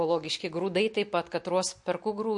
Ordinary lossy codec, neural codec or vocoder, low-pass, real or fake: MP3, 48 kbps; none; 10.8 kHz; real